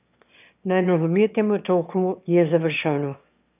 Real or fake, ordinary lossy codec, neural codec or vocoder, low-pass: fake; none; autoencoder, 22.05 kHz, a latent of 192 numbers a frame, VITS, trained on one speaker; 3.6 kHz